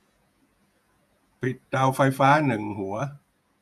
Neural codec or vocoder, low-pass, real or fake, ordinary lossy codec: vocoder, 48 kHz, 128 mel bands, Vocos; 14.4 kHz; fake; none